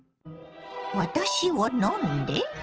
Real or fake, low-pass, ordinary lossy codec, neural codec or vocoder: real; 7.2 kHz; Opus, 16 kbps; none